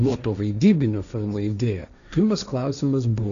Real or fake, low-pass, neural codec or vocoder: fake; 7.2 kHz; codec, 16 kHz, 1.1 kbps, Voila-Tokenizer